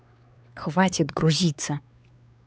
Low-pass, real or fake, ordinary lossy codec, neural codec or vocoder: none; fake; none; codec, 16 kHz, 4 kbps, X-Codec, WavLM features, trained on Multilingual LibriSpeech